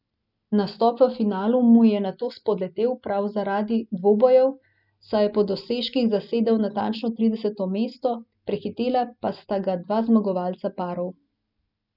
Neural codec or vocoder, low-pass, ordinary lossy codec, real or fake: none; 5.4 kHz; none; real